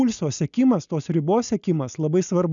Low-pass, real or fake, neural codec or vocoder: 7.2 kHz; real; none